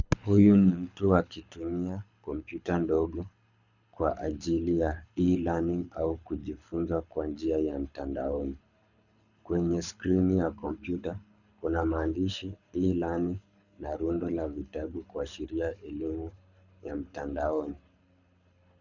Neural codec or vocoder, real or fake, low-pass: codec, 24 kHz, 6 kbps, HILCodec; fake; 7.2 kHz